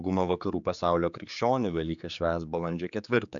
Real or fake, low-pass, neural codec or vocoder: fake; 7.2 kHz; codec, 16 kHz, 4 kbps, X-Codec, HuBERT features, trained on general audio